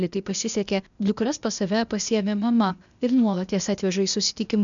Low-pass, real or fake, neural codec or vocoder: 7.2 kHz; fake; codec, 16 kHz, 0.8 kbps, ZipCodec